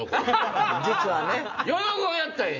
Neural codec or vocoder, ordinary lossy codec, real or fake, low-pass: none; none; real; 7.2 kHz